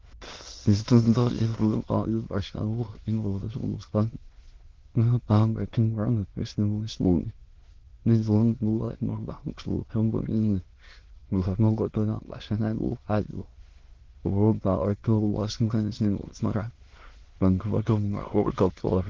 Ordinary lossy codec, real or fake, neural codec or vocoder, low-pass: Opus, 16 kbps; fake; autoencoder, 22.05 kHz, a latent of 192 numbers a frame, VITS, trained on many speakers; 7.2 kHz